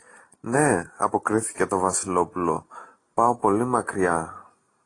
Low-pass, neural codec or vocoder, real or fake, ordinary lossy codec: 10.8 kHz; none; real; AAC, 32 kbps